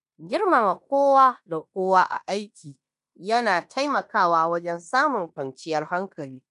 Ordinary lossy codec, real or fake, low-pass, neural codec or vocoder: none; fake; 10.8 kHz; codec, 16 kHz in and 24 kHz out, 0.9 kbps, LongCat-Audio-Codec, fine tuned four codebook decoder